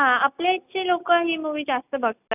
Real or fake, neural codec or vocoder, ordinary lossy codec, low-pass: real; none; none; 3.6 kHz